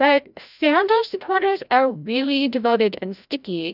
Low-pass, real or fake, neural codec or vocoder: 5.4 kHz; fake; codec, 16 kHz, 0.5 kbps, FreqCodec, larger model